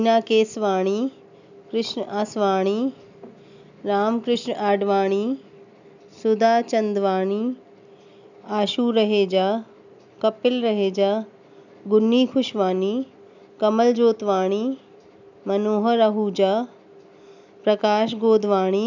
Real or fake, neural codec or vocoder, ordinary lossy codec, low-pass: real; none; none; 7.2 kHz